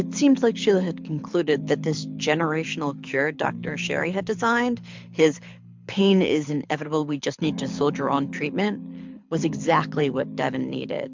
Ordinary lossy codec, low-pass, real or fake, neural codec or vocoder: MP3, 64 kbps; 7.2 kHz; fake; codec, 24 kHz, 6 kbps, HILCodec